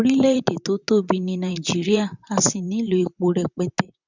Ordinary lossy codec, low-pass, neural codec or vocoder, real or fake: none; 7.2 kHz; vocoder, 44.1 kHz, 128 mel bands, Pupu-Vocoder; fake